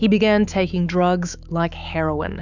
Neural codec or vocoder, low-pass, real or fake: autoencoder, 48 kHz, 128 numbers a frame, DAC-VAE, trained on Japanese speech; 7.2 kHz; fake